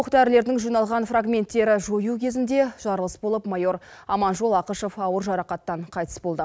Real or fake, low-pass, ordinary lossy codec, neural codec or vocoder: real; none; none; none